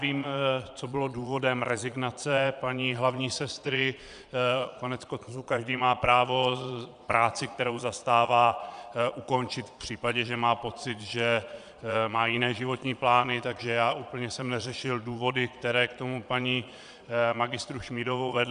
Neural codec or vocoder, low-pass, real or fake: vocoder, 22.05 kHz, 80 mel bands, Vocos; 9.9 kHz; fake